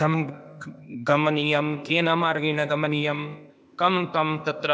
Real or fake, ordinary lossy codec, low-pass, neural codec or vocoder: fake; none; none; codec, 16 kHz, 0.8 kbps, ZipCodec